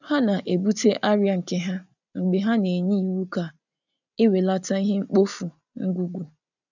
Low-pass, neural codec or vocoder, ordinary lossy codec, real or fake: 7.2 kHz; none; none; real